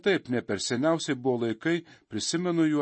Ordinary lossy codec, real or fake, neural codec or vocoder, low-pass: MP3, 32 kbps; real; none; 9.9 kHz